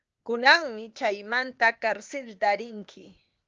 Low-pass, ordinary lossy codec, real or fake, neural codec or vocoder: 7.2 kHz; Opus, 24 kbps; fake; codec, 16 kHz, 0.8 kbps, ZipCodec